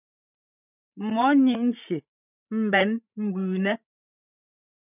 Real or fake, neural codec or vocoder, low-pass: fake; codec, 16 kHz, 16 kbps, FreqCodec, larger model; 3.6 kHz